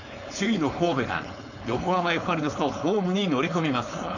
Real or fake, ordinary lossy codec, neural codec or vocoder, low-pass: fake; none; codec, 16 kHz, 4.8 kbps, FACodec; 7.2 kHz